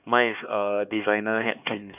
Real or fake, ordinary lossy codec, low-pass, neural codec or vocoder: fake; none; 3.6 kHz; codec, 16 kHz, 2 kbps, X-Codec, HuBERT features, trained on LibriSpeech